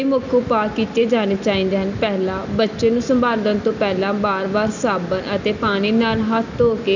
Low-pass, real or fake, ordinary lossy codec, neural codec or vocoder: 7.2 kHz; real; none; none